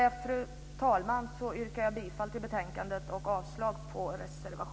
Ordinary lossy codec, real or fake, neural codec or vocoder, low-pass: none; real; none; none